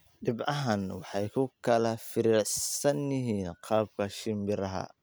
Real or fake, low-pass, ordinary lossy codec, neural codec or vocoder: real; none; none; none